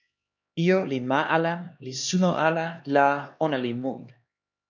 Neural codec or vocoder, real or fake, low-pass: codec, 16 kHz, 2 kbps, X-Codec, HuBERT features, trained on LibriSpeech; fake; 7.2 kHz